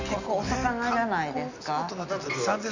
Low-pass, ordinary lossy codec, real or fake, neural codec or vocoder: 7.2 kHz; none; real; none